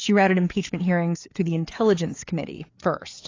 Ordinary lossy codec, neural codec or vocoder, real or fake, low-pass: AAC, 32 kbps; codec, 16 kHz, 4 kbps, FreqCodec, larger model; fake; 7.2 kHz